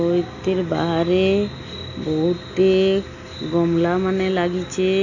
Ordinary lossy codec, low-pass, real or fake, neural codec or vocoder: none; 7.2 kHz; real; none